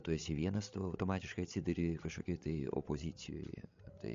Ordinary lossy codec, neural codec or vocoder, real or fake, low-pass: MP3, 48 kbps; codec, 16 kHz, 16 kbps, FreqCodec, larger model; fake; 7.2 kHz